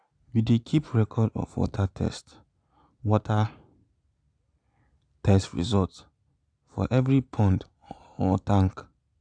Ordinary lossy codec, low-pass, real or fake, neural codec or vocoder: none; 9.9 kHz; real; none